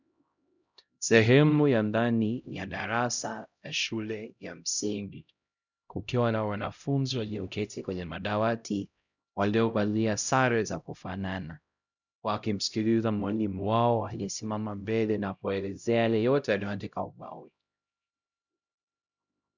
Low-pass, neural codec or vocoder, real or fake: 7.2 kHz; codec, 16 kHz, 0.5 kbps, X-Codec, HuBERT features, trained on LibriSpeech; fake